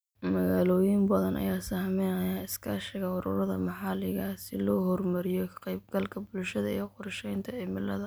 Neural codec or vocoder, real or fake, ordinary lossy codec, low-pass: none; real; none; none